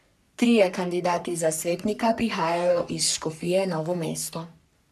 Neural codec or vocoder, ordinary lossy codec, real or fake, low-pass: codec, 44.1 kHz, 3.4 kbps, Pupu-Codec; none; fake; 14.4 kHz